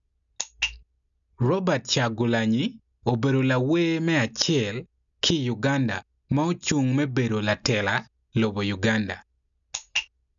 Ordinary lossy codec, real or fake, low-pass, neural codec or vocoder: none; real; 7.2 kHz; none